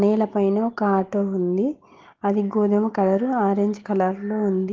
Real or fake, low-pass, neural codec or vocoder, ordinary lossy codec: real; 7.2 kHz; none; Opus, 16 kbps